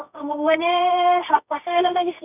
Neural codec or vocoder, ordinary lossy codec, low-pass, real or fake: codec, 24 kHz, 0.9 kbps, WavTokenizer, medium music audio release; none; 3.6 kHz; fake